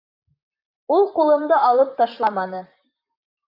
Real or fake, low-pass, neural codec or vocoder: fake; 5.4 kHz; vocoder, 44.1 kHz, 128 mel bands, Pupu-Vocoder